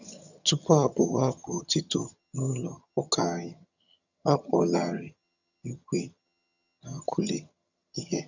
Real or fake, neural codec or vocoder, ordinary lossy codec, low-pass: fake; vocoder, 22.05 kHz, 80 mel bands, HiFi-GAN; none; 7.2 kHz